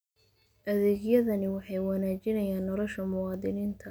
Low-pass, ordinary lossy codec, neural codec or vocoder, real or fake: none; none; none; real